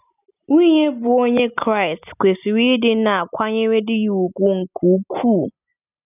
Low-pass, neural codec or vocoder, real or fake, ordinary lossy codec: 3.6 kHz; none; real; none